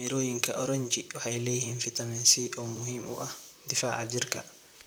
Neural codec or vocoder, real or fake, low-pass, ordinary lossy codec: vocoder, 44.1 kHz, 128 mel bands, Pupu-Vocoder; fake; none; none